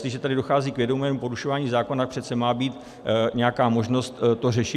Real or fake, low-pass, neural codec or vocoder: real; 14.4 kHz; none